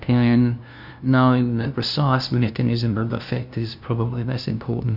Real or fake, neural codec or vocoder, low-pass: fake; codec, 16 kHz, 0.5 kbps, FunCodec, trained on LibriTTS, 25 frames a second; 5.4 kHz